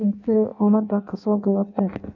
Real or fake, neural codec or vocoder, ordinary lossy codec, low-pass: fake; codec, 16 kHz in and 24 kHz out, 0.6 kbps, FireRedTTS-2 codec; none; 7.2 kHz